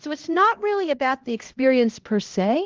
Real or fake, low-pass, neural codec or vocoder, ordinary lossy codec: fake; 7.2 kHz; codec, 16 kHz, 1 kbps, X-Codec, HuBERT features, trained on LibriSpeech; Opus, 16 kbps